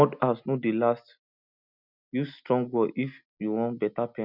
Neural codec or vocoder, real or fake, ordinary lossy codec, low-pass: none; real; none; 5.4 kHz